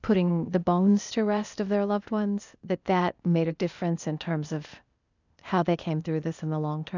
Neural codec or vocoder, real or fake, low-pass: codec, 16 kHz, 0.8 kbps, ZipCodec; fake; 7.2 kHz